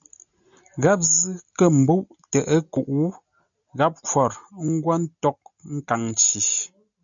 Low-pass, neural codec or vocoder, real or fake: 7.2 kHz; none; real